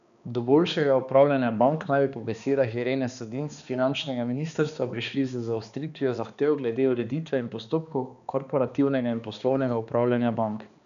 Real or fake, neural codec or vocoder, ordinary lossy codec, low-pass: fake; codec, 16 kHz, 2 kbps, X-Codec, HuBERT features, trained on balanced general audio; none; 7.2 kHz